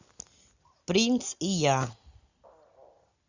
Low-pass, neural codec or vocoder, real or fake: 7.2 kHz; none; real